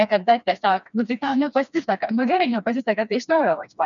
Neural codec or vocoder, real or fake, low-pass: codec, 16 kHz, 2 kbps, FreqCodec, smaller model; fake; 7.2 kHz